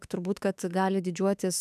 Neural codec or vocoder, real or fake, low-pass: autoencoder, 48 kHz, 32 numbers a frame, DAC-VAE, trained on Japanese speech; fake; 14.4 kHz